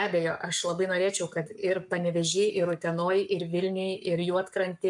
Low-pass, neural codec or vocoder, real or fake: 10.8 kHz; codec, 44.1 kHz, 7.8 kbps, Pupu-Codec; fake